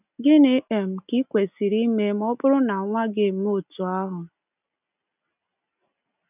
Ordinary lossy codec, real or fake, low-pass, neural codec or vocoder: none; real; 3.6 kHz; none